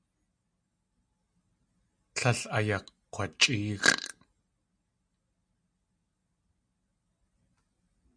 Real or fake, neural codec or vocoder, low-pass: real; none; 9.9 kHz